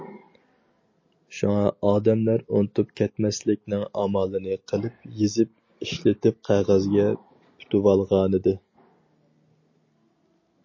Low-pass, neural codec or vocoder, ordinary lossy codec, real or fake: 7.2 kHz; none; MP3, 32 kbps; real